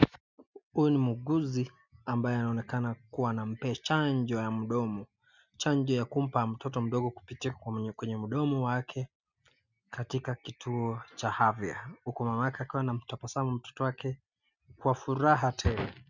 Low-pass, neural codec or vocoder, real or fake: 7.2 kHz; none; real